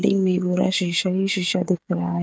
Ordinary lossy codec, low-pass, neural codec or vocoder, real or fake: none; none; codec, 16 kHz, 16 kbps, FreqCodec, smaller model; fake